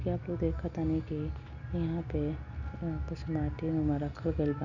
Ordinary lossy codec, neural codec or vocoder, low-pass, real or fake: none; none; 7.2 kHz; real